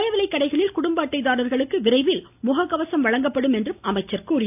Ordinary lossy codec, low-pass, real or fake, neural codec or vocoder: none; 3.6 kHz; real; none